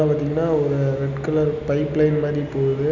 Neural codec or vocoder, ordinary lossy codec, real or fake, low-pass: none; none; real; 7.2 kHz